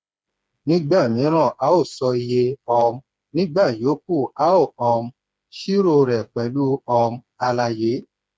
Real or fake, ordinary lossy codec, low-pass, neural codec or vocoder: fake; none; none; codec, 16 kHz, 4 kbps, FreqCodec, smaller model